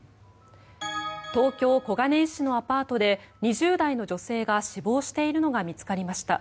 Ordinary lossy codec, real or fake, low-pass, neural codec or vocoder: none; real; none; none